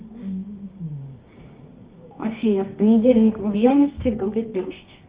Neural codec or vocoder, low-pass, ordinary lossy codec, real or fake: codec, 24 kHz, 0.9 kbps, WavTokenizer, medium music audio release; 3.6 kHz; Opus, 32 kbps; fake